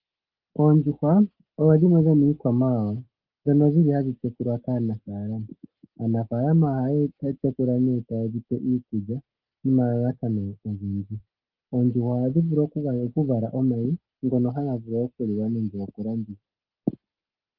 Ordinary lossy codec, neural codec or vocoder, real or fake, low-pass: Opus, 32 kbps; none; real; 5.4 kHz